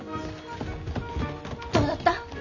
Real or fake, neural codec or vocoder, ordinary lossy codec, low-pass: real; none; none; 7.2 kHz